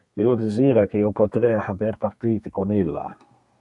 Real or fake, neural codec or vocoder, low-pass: fake; codec, 32 kHz, 1.9 kbps, SNAC; 10.8 kHz